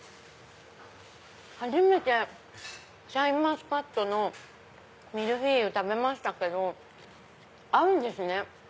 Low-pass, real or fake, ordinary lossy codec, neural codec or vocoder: none; real; none; none